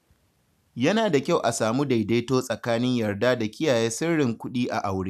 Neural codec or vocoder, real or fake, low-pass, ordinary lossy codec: none; real; 14.4 kHz; none